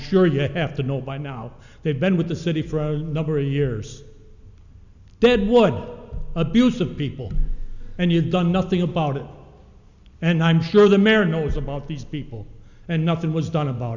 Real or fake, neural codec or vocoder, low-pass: real; none; 7.2 kHz